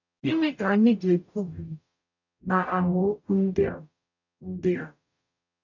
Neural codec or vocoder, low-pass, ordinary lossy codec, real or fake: codec, 44.1 kHz, 0.9 kbps, DAC; 7.2 kHz; none; fake